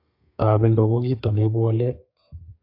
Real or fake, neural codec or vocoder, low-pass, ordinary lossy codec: fake; codec, 32 kHz, 1.9 kbps, SNAC; 5.4 kHz; AAC, 32 kbps